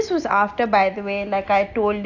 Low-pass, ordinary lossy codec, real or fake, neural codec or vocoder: 7.2 kHz; AAC, 48 kbps; real; none